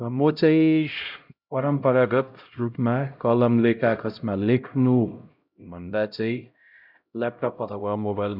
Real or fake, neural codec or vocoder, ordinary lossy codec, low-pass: fake; codec, 16 kHz, 0.5 kbps, X-Codec, HuBERT features, trained on LibriSpeech; none; 5.4 kHz